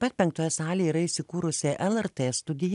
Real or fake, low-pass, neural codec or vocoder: real; 10.8 kHz; none